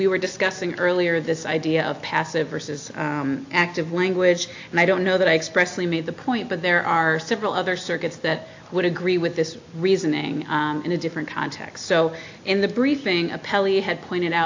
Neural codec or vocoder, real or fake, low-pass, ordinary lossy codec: none; real; 7.2 kHz; AAC, 48 kbps